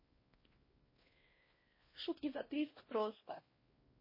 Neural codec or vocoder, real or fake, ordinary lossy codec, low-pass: codec, 16 kHz, 1 kbps, X-Codec, WavLM features, trained on Multilingual LibriSpeech; fake; MP3, 24 kbps; 5.4 kHz